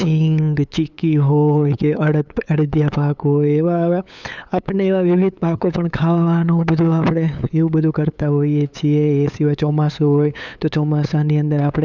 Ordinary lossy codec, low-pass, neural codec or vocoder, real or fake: none; 7.2 kHz; codec, 16 kHz, 8 kbps, FunCodec, trained on LibriTTS, 25 frames a second; fake